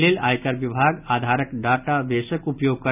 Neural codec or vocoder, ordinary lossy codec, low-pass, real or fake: none; none; 3.6 kHz; real